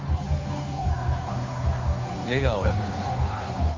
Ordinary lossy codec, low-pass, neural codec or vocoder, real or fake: Opus, 32 kbps; 7.2 kHz; codec, 16 kHz, 2 kbps, FunCodec, trained on Chinese and English, 25 frames a second; fake